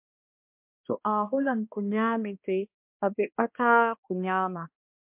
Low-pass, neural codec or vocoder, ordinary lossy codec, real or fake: 3.6 kHz; codec, 16 kHz, 1 kbps, X-Codec, HuBERT features, trained on balanced general audio; MP3, 32 kbps; fake